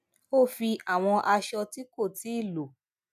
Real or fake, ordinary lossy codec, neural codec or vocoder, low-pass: real; none; none; 14.4 kHz